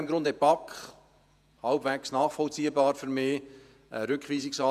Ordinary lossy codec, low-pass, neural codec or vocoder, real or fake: none; 14.4 kHz; vocoder, 48 kHz, 128 mel bands, Vocos; fake